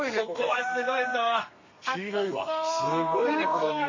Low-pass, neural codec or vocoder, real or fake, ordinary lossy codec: 7.2 kHz; codec, 32 kHz, 1.9 kbps, SNAC; fake; MP3, 32 kbps